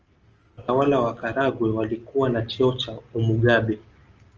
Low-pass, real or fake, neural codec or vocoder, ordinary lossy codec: 7.2 kHz; real; none; Opus, 24 kbps